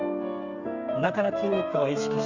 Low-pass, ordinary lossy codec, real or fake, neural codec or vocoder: 7.2 kHz; Opus, 64 kbps; fake; codec, 32 kHz, 1.9 kbps, SNAC